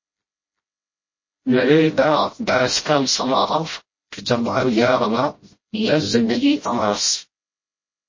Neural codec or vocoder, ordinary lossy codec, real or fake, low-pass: codec, 16 kHz, 0.5 kbps, FreqCodec, smaller model; MP3, 32 kbps; fake; 7.2 kHz